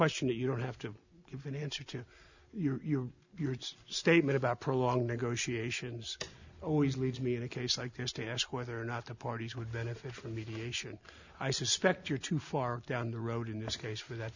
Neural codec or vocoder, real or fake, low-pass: none; real; 7.2 kHz